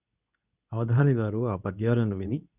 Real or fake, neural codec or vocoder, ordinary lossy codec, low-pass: fake; codec, 24 kHz, 0.9 kbps, WavTokenizer, medium speech release version 2; none; 3.6 kHz